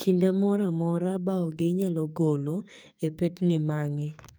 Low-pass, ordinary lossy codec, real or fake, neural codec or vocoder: none; none; fake; codec, 44.1 kHz, 2.6 kbps, SNAC